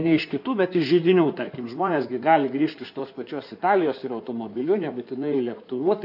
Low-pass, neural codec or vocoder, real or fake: 5.4 kHz; codec, 16 kHz in and 24 kHz out, 2.2 kbps, FireRedTTS-2 codec; fake